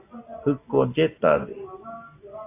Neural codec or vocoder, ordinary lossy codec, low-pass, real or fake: none; AAC, 24 kbps; 3.6 kHz; real